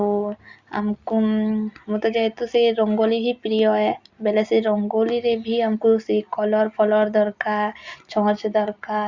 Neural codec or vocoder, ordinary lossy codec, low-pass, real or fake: none; Opus, 64 kbps; 7.2 kHz; real